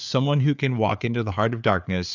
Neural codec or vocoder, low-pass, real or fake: codec, 16 kHz, 4 kbps, FreqCodec, larger model; 7.2 kHz; fake